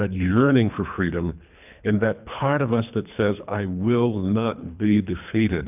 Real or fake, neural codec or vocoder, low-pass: fake; codec, 24 kHz, 3 kbps, HILCodec; 3.6 kHz